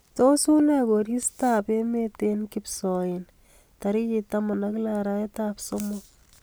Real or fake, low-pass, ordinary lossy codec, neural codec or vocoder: real; none; none; none